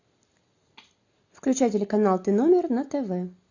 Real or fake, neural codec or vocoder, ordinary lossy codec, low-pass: real; none; AAC, 48 kbps; 7.2 kHz